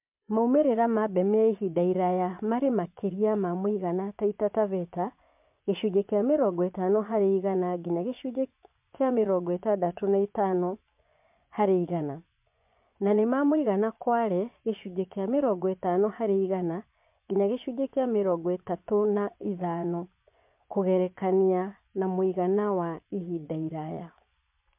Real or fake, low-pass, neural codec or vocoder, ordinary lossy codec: fake; 3.6 kHz; vocoder, 24 kHz, 100 mel bands, Vocos; MP3, 32 kbps